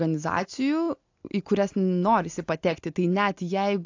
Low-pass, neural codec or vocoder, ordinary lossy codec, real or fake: 7.2 kHz; none; AAC, 48 kbps; real